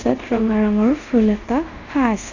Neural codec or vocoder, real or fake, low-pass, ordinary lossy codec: codec, 24 kHz, 0.5 kbps, DualCodec; fake; 7.2 kHz; none